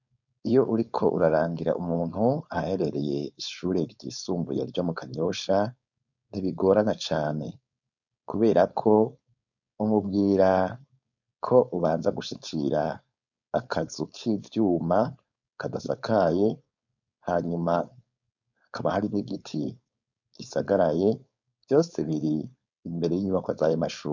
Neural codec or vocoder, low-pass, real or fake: codec, 16 kHz, 4.8 kbps, FACodec; 7.2 kHz; fake